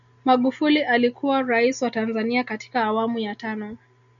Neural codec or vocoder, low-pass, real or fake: none; 7.2 kHz; real